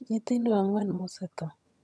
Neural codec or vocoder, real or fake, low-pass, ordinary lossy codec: vocoder, 22.05 kHz, 80 mel bands, HiFi-GAN; fake; none; none